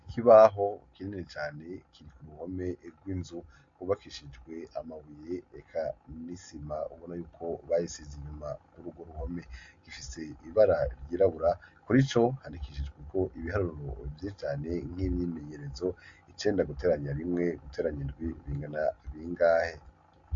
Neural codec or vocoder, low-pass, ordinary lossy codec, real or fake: none; 7.2 kHz; MP3, 48 kbps; real